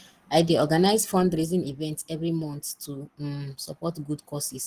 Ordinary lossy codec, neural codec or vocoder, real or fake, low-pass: Opus, 16 kbps; none; real; 14.4 kHz